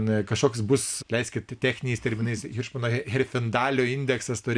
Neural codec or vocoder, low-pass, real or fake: none; 9.9 kHz; real